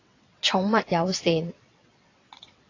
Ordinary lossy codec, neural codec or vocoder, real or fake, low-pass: AAC, 32 kbps; vocoder, 22.05 kHz, 80 mel bands, WaveNeXt; fake; 7.2 kHz